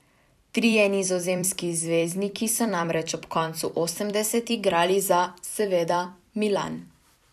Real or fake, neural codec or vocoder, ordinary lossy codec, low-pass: fake; vocoder, 44.1 kHz, 128 mel bands every 256 samples, BigVGAN v2; none; 14.4 kHz